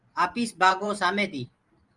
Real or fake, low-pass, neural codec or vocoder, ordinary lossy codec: fake; 10.8 kHz; vocoder, 44.1 kHz, 128 mel bands every 512 samples, BigVGAN v2; Opus, 32 kbps